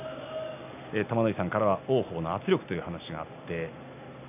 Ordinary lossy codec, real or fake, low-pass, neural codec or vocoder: none; real; 3.6 kHz; none